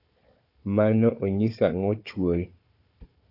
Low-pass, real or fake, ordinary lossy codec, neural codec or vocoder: 5.4 kHz; fake; AAC, 32 kbps; codec, 16 kHz, 4 kbps, FunCodec, trained on Chinese and English, 50 frames a second